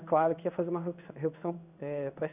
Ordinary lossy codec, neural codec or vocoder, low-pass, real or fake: none; codec, 16 kHz in and 24 kHz out, 1 kbps, XY-Tokenizer; 3.6 kHz; fake